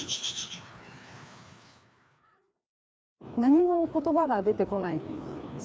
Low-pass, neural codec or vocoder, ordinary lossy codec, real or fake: none; codec, 16 kHz, 2 kbps, FreqCodec, larger model; none; fake